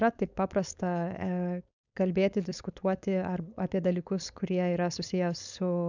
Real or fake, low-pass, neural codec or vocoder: fake; 7.2 kHz; codec, 16 kHz, 4.8 kbps, FACodec